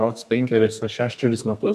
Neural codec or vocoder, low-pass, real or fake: codec, 32 kHz, 1.9 kbps, SNAC; 14.4 kHz; fake